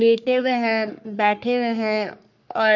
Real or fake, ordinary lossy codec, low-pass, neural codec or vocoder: fake; none; 7.2 kHz; codec, 44.1 kHz, 3.4 kbps, Pupu-Codec